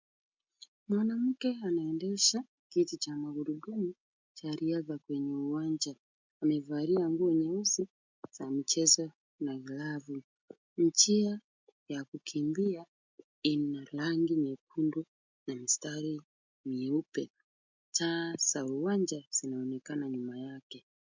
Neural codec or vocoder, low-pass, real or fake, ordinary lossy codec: none; 7.2 kHz; real; MP3, 64 kbps